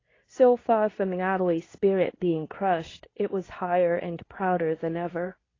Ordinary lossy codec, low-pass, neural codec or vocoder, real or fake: AAC, 32 kbps; 7.2 kHz; codec, 24 kHz, 0.9 kbps, WavTokenizer, medium speech release version 2; fake